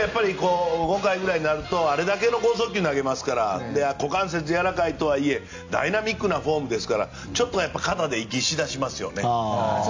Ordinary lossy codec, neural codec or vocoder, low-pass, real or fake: AAC, 48 kbps; none; 7.2 kHz; real